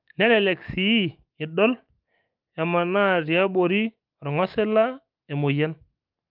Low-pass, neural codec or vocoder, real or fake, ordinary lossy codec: 5.4 kHz; autoencoder, 48 kHz, 128 numbers a frame, DAC-VAE, trained on Japanese speech; fake; Opus, 24 kbps